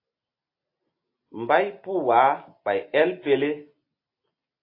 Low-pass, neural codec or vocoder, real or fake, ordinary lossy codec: 5.4 kHz; none; real; AAC, 32 kbps